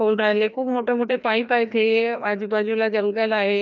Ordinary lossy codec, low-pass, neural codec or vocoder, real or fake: none; 7.2 kHz; codec, 16 kHz, 1 kbps, FreqCodec, larger model; fake